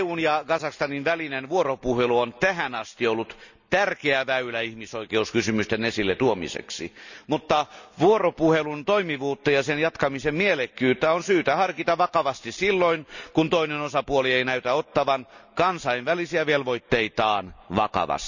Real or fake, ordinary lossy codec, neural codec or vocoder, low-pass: real; none; none; 7.2 kHz